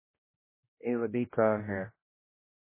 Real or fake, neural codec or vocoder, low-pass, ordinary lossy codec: fake; codec, 16 kHz, 1 kbps, X-Codec, HuBERT features, trained on general audio; 3.6 kHz; MP3, 16 kbps